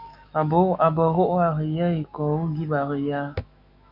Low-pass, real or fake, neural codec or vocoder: 5.4 kHz; fake; autoencoder, 48 kHz, 128 numbers a frame, DAC-VAE, trained on Japanese speech